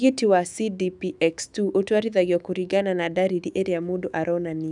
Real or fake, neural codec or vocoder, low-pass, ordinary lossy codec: fake; autoencoder, 48 kHz, 128 numbers a frame, DAC-VAE, trained on Japanese speech; 10.8 kHz; none